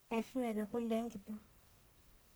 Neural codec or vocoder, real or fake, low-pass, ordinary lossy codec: codec, 44.1 kHz, 1.7 kbps, Pupu-Codec; fake; none; none